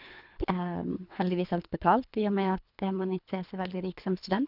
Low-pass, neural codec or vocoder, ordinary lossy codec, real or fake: 5.4 kHz; codec, 24 kHz, 3 kbps, HILCodec; none; fake